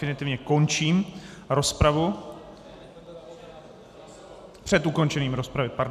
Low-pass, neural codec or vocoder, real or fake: 14.4 kHz; none; real